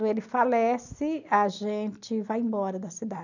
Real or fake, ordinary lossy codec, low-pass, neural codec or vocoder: real; none; 7.2 kHz; none